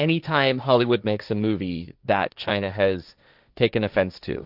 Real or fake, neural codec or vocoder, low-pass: fake; codec, 16 kHz, 1.1 kbps, Voila-Tokenizer; 5.4 kHz